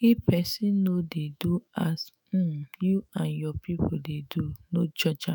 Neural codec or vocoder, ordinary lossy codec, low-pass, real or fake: autoencoder, 48 kHz, 128 numbers a frame, DAC-VAE, trained on Japanese speech; none; none; fake